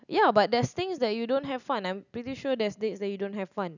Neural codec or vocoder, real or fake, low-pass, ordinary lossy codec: none; real; 7.2 kHz; none